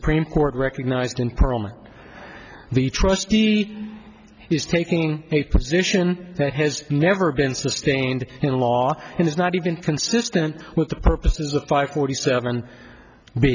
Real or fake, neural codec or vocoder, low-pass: real; none; 7.2 kHz